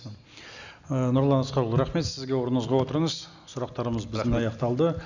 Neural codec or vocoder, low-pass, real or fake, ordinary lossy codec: none; 7.2 kHz; real; none